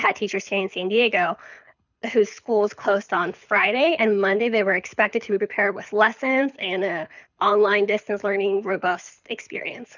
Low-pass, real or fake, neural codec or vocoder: 7.2 kHz; fake; codec, 24 kHz, 6 kbps, HILCodec